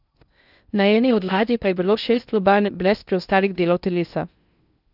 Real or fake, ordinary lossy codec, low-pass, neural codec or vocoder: fake; none; 5.4 kHz; codec, 16 kHz in and 24 kHz out, 0.6 kbps, FocalCodec, streaming, 2048 codes